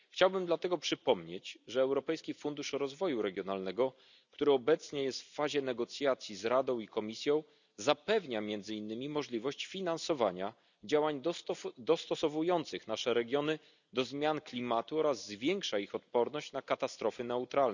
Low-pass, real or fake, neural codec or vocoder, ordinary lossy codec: 7.2 kHz; real; none; none